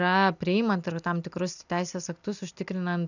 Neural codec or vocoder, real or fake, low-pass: none; real; 7.2 kHz